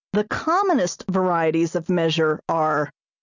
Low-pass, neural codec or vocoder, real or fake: 7.2 kHz; none; real